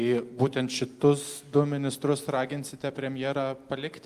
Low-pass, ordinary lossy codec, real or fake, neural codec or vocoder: 14.4 kHz; Opus, 32 kbps; real; none